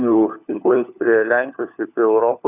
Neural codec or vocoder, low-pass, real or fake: codec, 16 kHz, 4 kbps, FunCodec, trained on LibriTTS, 50 frames a second; 3.6 kHz; fake